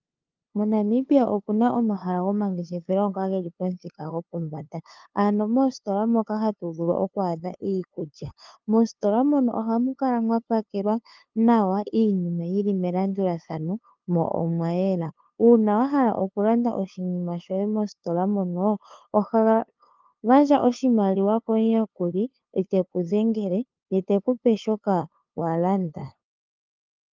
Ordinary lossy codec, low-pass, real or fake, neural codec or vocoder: Opus, 24 kbps; 7.2 kHz; fake; codec, 16 kHz, 2 kbps, FunCodec, trained on LibriTTS, 25 frames a second